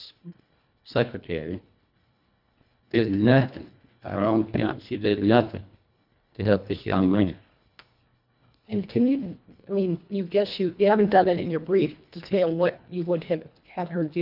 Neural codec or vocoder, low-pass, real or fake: codec, 24 kHz, 1.5 kbps, HILCodec; 5.4 kHz; fake